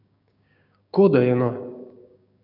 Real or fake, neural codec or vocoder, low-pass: fake; codec, 16 kHz, 6 kbps, DAC; 5.4 kHz